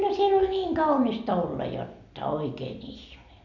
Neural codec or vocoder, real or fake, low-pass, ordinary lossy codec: none; real; 7.2 kHz; none